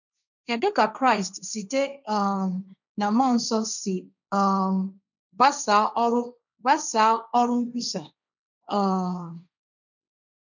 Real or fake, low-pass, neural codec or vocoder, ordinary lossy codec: fake; 7.2 kHz; codec, 16 kHz, 1.1 kbps, Voila-Tokenizer; none